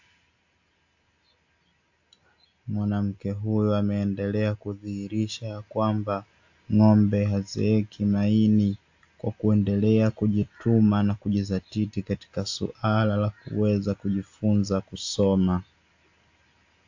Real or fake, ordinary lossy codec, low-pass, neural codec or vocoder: real; AAC, 48 kbps; 7.2 kHz; none